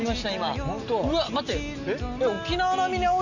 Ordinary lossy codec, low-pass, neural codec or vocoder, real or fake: none; 7.2 kHz; none; real